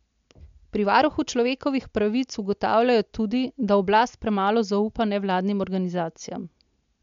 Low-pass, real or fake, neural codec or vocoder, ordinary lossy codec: 7.2 kHz; real; none; MP3, 64 kbps